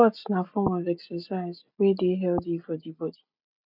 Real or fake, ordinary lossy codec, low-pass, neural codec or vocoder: real; none; 5.4 kHz; none